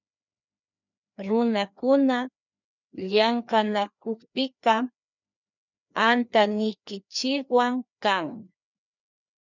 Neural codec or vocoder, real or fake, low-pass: codec, 16 kHz, 2 kbps, FreqCodec, larger model; fake; 7.2 kHz